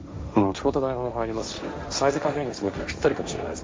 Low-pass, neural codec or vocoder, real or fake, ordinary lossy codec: none; codec, 16 kHz, 1.1 kbps, Voila-Tokenizer; fake; none